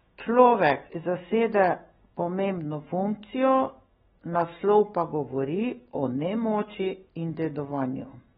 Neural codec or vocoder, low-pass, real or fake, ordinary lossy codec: autoencoder, 48 kHz, 128 numbers a frame, DAC-VAE, trained on Japanese speech; 19.8 kHz; fake; AAC, 16 kbps